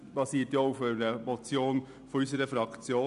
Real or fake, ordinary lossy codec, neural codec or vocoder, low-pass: real; none; none; 10.8 kHz